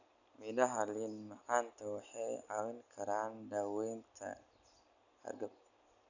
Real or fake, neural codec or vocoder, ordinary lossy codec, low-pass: real; none; none; 7.2 kHz